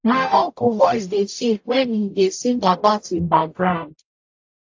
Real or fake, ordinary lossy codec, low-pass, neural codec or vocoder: fake; AAC, 48 kbps; 7.2 kHz; codec, 44.1 kHz, 0.9 kbps, DAC